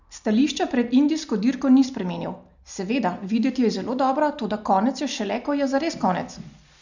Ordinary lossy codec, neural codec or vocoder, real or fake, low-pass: none; none; real; 7.2 kHz